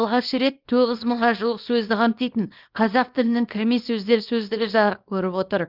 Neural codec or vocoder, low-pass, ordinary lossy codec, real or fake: codec, 24 kHz, 0.9 kbps, WavTokenizer, small release; 5.4 kHz; Opus, 24 kbps; fake